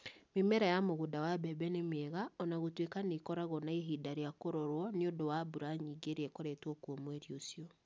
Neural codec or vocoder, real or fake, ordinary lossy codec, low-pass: none; real; none; none